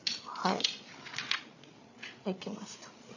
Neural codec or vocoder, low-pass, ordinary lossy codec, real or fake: codec, 16 kHz, 8 kbps, FreqCodec, larger model; 7.2 kHz; none; fake